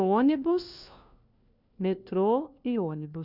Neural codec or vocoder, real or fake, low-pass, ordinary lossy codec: codec, 16 kHz, 1 kbps, FunCodec, trained on LibriTTS, 50 frames a second; fake; 5.4 kHz; none